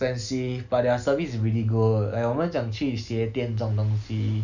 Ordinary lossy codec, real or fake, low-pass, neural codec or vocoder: none; real; 7.2 kHz; none